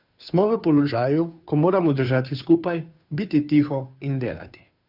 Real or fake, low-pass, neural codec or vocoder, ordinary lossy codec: fake; 5.4 kHz; codec, 16 kHz, 2 kbps, FunCodec, trained on Chinese and English, 25 frames a second; none